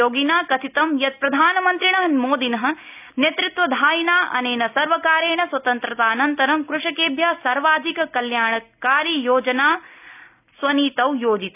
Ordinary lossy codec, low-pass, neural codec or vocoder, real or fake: none; 3.6 kHz; none; real